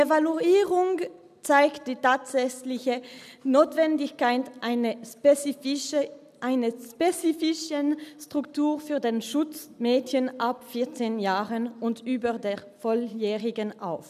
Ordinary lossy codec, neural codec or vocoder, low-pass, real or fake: none; none; 14.4 kHz; real